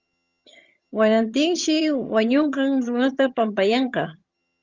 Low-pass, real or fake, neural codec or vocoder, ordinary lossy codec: 7.2 kHz; fake; vocoder, 22.05 kHz, 80 mel bands, HiFi-GAN; Opus, 32 kbps